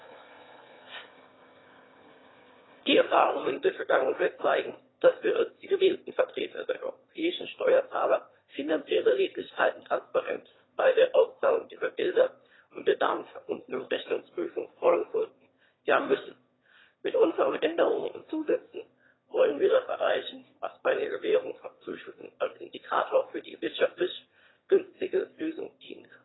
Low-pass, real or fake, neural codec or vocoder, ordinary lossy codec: 7.2 kHz; fake; autoencoder, 22.05 kHz, a latent of 192 numbers a frame, VITS, trained on one speaker; AAC, 16 kbps